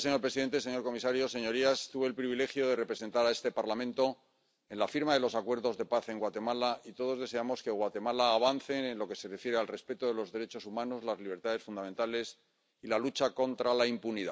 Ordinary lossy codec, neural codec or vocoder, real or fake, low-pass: none; none; real; none